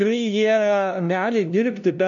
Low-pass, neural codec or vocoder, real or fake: 7.2 kHz; codec, 16 kHz, 0.5 kbps, FunCodec, trained on LibriTTS, 25 frames a second; fake